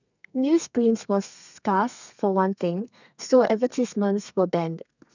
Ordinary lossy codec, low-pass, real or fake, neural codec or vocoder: none; 7.2 kHz; fake; codec, 32 kHz, 1.9 kbps, SNAC